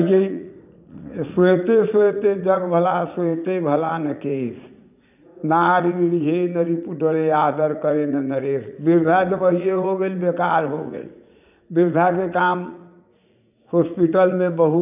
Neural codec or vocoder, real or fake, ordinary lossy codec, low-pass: vocoder, 44.1 kHz, 80 mel bands, Vocos; fake; none; 3.6 kHz